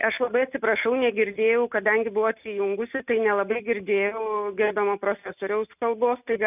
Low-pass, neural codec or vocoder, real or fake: 3.6 kHz; none; real